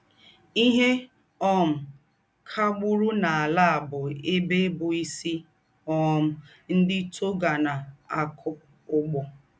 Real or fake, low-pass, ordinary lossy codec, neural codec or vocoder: real; none; none; none